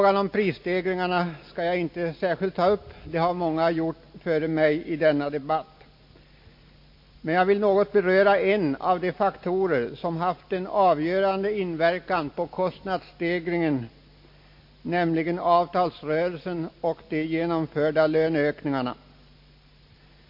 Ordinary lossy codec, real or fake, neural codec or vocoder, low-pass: MP3, 32 kbps; real; none; 5.4 kHz